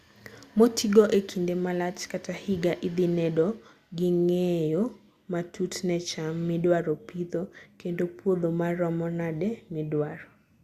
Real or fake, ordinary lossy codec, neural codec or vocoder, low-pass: real; Opus, 64 kbps; none; 14.4 kHz